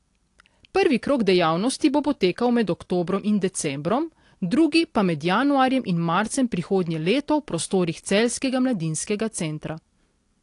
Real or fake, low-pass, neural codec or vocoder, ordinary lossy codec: real; 10.8 kHz; none; AAC, 48 kbps